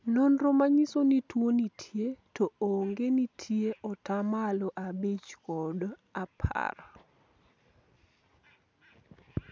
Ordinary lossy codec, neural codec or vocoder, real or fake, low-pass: none; none; real; none